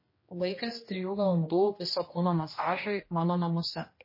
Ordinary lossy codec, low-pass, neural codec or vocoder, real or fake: MP3, 24 kbps; 5.4 kHz; codec, 16 kHz, 1 kbps, X-Codec, HuBERT features, trained on general audio; fake